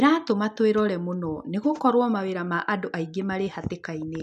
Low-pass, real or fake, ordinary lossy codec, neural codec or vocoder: 14.4 kHz; real; none; none